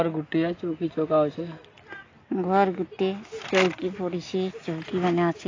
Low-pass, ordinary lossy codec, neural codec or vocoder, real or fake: 7.2 kHz; AAC, 32 kbps; none; real